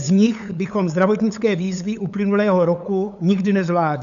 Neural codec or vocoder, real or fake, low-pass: codec, 16 kHz, 8 kbps, FunCodec, trained on LibriTTS, 25 frames a second; fake; 7.2 kHz